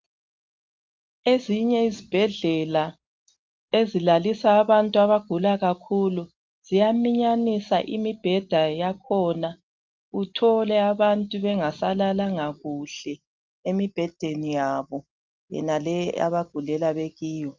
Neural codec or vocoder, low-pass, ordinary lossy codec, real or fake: none; 7.2 kHz; Opus, 24 kbps; real